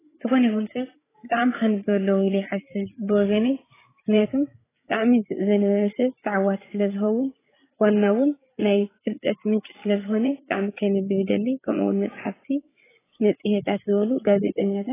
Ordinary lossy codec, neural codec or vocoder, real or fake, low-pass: AAC, 16 kbps; codec, 16 kHz, 8 kbps, FreqCodec, larger model; fake; 3.6 kHz